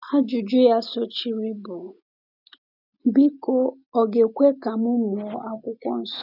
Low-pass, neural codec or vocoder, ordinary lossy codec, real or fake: 5.4 kHz; none; none; real